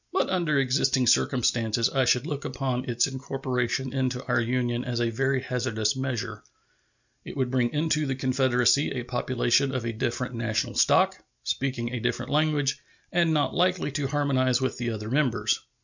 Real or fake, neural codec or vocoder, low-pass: real; none; 7.2 kHz